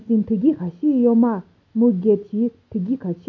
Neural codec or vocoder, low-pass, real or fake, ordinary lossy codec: none; 7.2 kHz; real; none